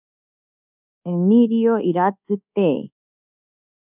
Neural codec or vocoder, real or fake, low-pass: codec, 24 kHz, 1.2 kbps, DualCodec; fake; 3.6 kHz